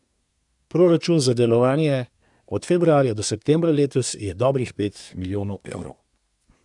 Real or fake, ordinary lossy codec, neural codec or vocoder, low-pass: fake; none; codec, 24 kHz, 1 kbps, SNAC; 10.8 kHz